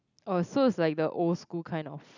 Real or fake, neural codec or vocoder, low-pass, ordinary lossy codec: real; none; 7.2 kHz; none